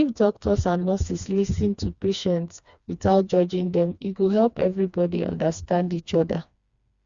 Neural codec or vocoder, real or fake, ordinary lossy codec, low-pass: codec, 16 kHz, 2 kbps, FreqCodec, smaller model; fake; Opus, 64 kbps; 7.2 kHz